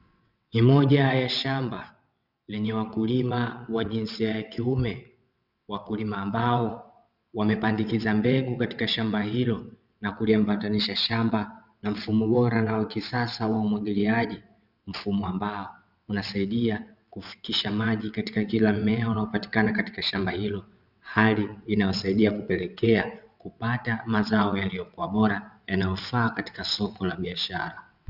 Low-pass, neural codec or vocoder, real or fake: 5.4 kHz; vocoder, 22.05 kHz, 80 mel bands, WaveNeXt; fake